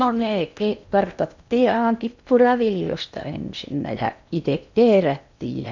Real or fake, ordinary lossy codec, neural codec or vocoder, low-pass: fake; none; codec, 16 kHz in and 24 kHz out, 0.8 kbps, FocalCodec, streaming, 65536 codes; 7.2 kHz